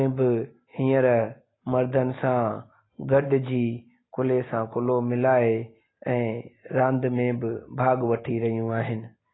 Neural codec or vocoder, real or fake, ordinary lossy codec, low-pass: none; real; AAC, 16 kbps; 7.2 kHz